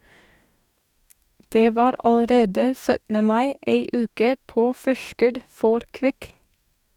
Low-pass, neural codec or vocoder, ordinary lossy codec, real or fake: 19.8 kHz; codec, 44.1 kHz, 2.6 kbps, DAC; none; fake